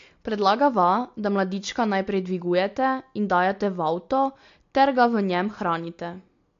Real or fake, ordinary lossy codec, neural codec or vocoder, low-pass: real; AAC, 48 kbps; none; 7.2 kHz